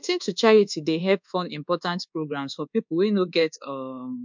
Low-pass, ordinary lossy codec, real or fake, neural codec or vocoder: 7.2 kHz; MP3, 64 kbps; fake; codec, 24 kHz, 1.2 kbps, DualCodec